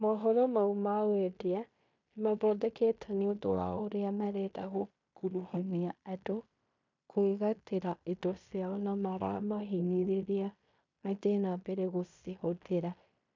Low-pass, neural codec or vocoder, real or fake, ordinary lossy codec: 7.2 kHz; codec, 16 kHz in and 24 kHz out, 0.9 kbps, LongCat-Audio-Codec, fine tuned four codebook decoder; fake; MP3, 64 kbps